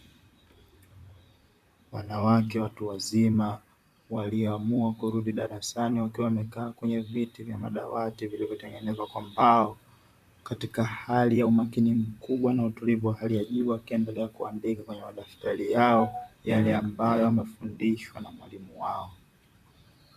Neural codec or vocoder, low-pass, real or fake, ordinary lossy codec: vocoder, 44.1 kHz, 128 mel bands, Pupu-Vocoder; 14.4 kHz; fake; MP3, 96 kbps